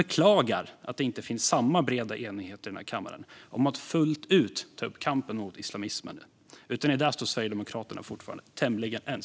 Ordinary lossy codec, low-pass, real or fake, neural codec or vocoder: none; none; real; none